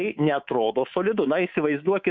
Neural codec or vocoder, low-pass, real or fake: codec, 24 kHz, 3.1 kbps, DualCodec; 7.2 kHz; fake